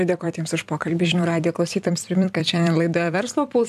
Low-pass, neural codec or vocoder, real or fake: 14.4 kHz; vocoder, 44.1 kHz, 128 mel bands every 256 samples, BigVGAN v2; fake